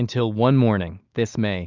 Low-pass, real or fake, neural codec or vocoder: 7.2 kHz; real; none